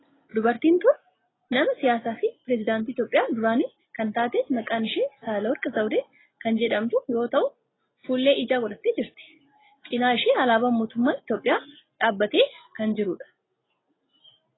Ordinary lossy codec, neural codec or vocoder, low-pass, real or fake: AAC, 16 kbps; none; 7.2 kHz; real